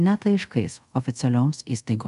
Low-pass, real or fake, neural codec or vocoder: 10.8 kHz; fake; codec, 24 kHz, 0.5 kbps, DualCodec